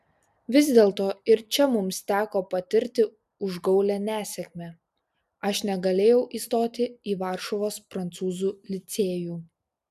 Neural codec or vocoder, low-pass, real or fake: none; 14.4 kHz; real